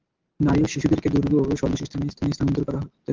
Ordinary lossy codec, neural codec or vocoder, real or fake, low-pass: Opus, 16 kbps; none; real; 7.2 kHz